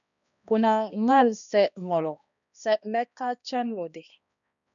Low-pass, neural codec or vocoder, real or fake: 7.2 kHz; codec, 16 kHz, 1 kbps, X-Codec, HuBERT features, trained on balanced general audio; fake